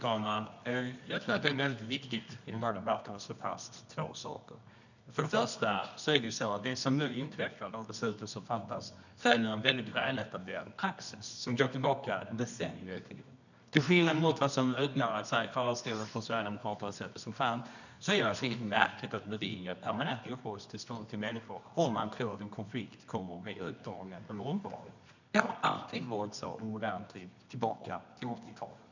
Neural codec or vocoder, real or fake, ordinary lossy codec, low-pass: codec, 24 kHz, 0.9 kbps, WavTokenizer, medium music audio release; fake; none; 7.2 kHz